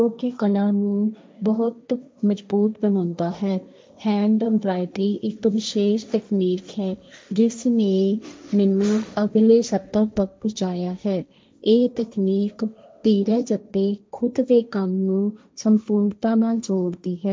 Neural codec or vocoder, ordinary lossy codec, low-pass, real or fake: codec, 16 kHz, 1.1 kbps, Voila-Tokenizer; none; none; fake